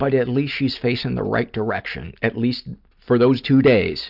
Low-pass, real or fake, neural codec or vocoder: 5.4 kHz; real; none